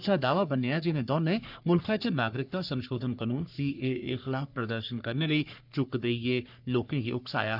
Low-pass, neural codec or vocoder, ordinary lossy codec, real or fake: 5.4 kHz; codec, 44.1 kHz, 3.4 kbps, Pupu-Codec; none; fake